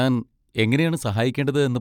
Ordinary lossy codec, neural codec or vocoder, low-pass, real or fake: none; none; 19.8 kHz; real